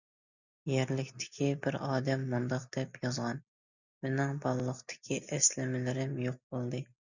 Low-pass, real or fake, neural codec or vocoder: 7.2 kHz; real; none